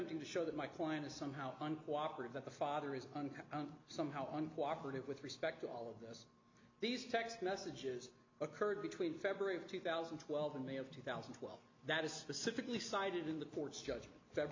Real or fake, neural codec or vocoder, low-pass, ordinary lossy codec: real; none; 7.2 kHz; MP3, 48 kbps